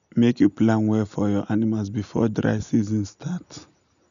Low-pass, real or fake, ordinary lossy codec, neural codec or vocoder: 7.2 kHz; real; none; none